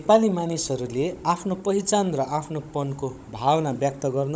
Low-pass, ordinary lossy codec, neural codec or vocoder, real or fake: none; none; codec, 16 kHz, 16 kbps, FunCodec, trained on Chinese and English, 50 frames a second; fake